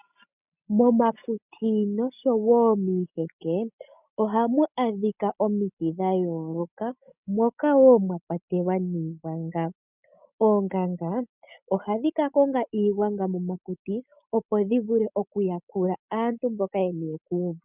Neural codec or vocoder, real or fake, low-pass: none; real; 3.6 kHz